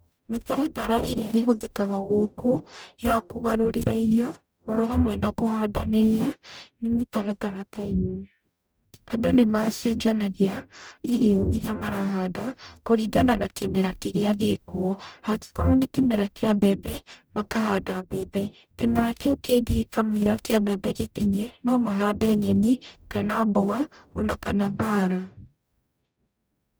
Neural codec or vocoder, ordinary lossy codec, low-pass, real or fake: codec, 44.1 kHz, 0.9 kbps, DAC; none; none; fake